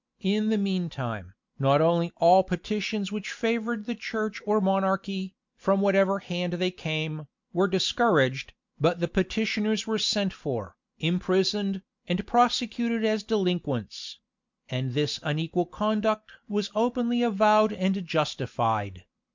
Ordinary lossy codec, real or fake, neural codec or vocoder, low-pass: MP3, 64 kbps; real; none; 7.2 kHz